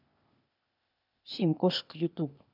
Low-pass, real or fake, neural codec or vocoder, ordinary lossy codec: 5.4 kHz; fake; codec, 16 kHz, 0.8 kbps, ZipCodec; none